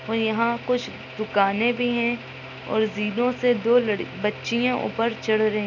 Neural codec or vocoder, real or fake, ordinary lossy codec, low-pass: none; real; none; 7.2 kHz